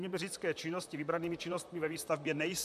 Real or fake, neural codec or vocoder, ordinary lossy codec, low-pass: fake; vocoder, 44.1 kHz, 128 mel bands, Pupu-Vocoder; MP3, 96 kbps; 14.4 kHz